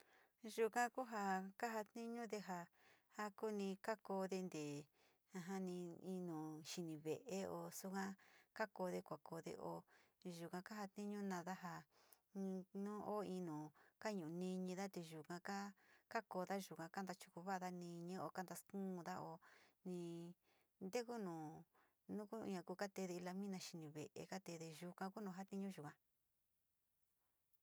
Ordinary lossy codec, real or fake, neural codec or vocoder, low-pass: none; real; none; none